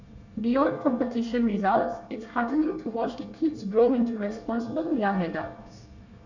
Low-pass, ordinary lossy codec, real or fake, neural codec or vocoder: 7.2 kHz; none; fake; codec, 24 kHz, 1 kbps, SNAC